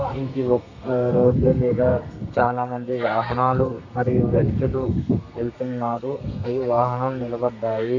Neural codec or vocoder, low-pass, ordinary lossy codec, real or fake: codec, 32 kHz, 1.9 kbps, SNAC; 7.2 kHz; none; fake